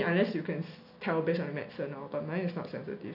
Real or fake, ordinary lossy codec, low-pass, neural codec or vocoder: real; none; 5.4 kHz; none